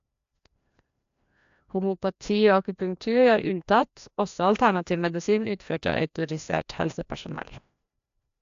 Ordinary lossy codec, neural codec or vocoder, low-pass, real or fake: AAC, 64 kbps; codec, 16 kHz, 1 kbps, FreqCodec, larger model; 7.2 kHz; fake